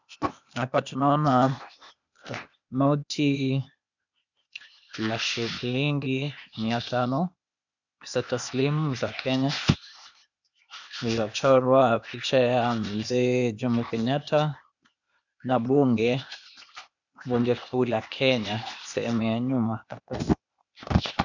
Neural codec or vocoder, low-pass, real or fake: codec, 16 kHz, 0.8 kbps, ZipCodec; 7.2 kHz; fake